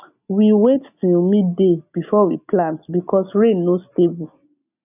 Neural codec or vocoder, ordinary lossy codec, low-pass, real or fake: none; none; 3.6 kHz; real